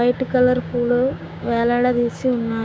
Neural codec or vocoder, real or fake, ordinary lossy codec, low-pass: codec, 16 kHz, 6 kbps, DAC; fake; none; none